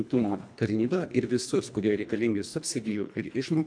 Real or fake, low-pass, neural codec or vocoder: fake; 9.9 kHz; codec, 24 kHz, 1.5 kbps, HILCodec